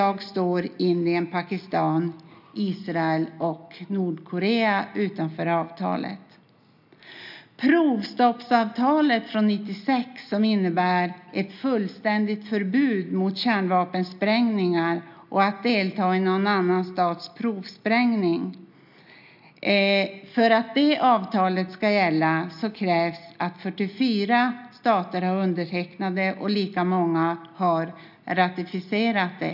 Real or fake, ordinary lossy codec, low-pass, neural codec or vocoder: real; none; 5.4 kHz; none